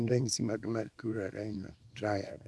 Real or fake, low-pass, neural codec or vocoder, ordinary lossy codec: fake; none; codec, 24 kHz, 0.9 kbps, WavTokenizer, small release; none